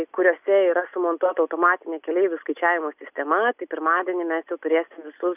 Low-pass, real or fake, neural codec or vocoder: 3.6 kHz; real; none